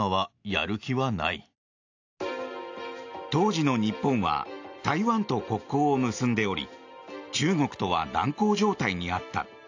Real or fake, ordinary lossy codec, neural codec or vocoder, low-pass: real; AAC, 48 kbps; none; 7.2 kHz